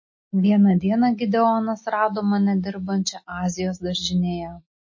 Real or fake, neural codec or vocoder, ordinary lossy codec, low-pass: real; none; MP3, 32 kbps; 7.2 kHz